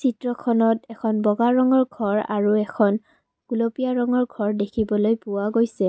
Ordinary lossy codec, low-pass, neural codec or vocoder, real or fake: none; none; none; real